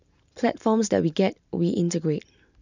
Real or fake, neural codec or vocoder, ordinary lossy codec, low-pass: real; none; none; 7.2 kHz